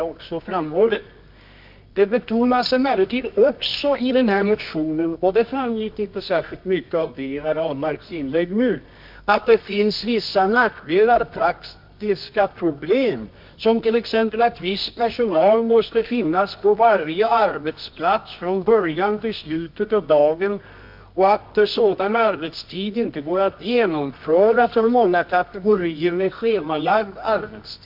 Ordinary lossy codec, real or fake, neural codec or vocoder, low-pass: MP3, 48 kbps; fake; codec, 24 kHz, 0.9 kbps, WavTokenizer, medium music audio release; 5.4 kHz